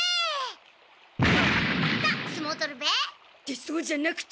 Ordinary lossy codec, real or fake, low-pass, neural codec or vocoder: none; real; none; none